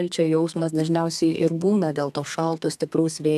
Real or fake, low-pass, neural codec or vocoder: fake; 14.4 kHz; codec, 32 kHz, 1.9 kbps, SNAC